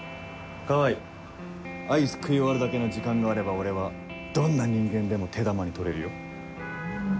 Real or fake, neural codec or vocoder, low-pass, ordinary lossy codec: real; none; none; none